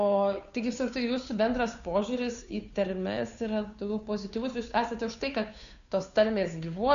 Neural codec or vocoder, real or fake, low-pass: codec, 16 kHz, 2 kbps, FunCodec, trained on Chinese and English, 25 frames a second; fake; 7.2 kHz